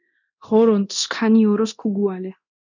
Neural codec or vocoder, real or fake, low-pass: codec, 24 kHz, 0.9 kbps, DualCodec; fake; 7.2 kHz